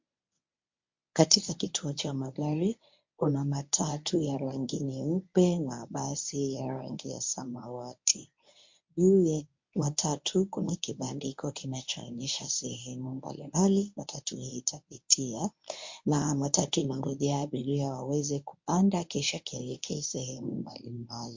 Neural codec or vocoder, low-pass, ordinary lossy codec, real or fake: codec, 24 kHz, 0.9 kbps, WavTokenizer, medium speech release version 1; 7.2 kHz; MP3, 48 kbps; fake